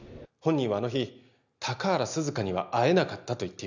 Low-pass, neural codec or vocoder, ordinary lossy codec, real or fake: 7.2 kHz; none; none; real